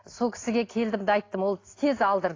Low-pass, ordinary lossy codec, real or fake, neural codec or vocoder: 7.2 kHz; AAC, 32 kbps; real; none